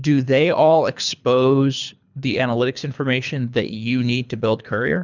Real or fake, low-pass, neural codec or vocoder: fake; 7.2 kHz; codec, 24 kHz, 3 kbps, HILCodec